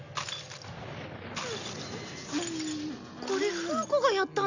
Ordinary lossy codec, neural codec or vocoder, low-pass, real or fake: none; none; 7.2 kHz; real